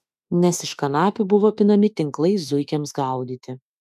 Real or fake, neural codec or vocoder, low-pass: fake; autoencoder, 48 kHz, 32 numbers a frame, DAC-VAE, trained on Japanese speech; 14.4 kHz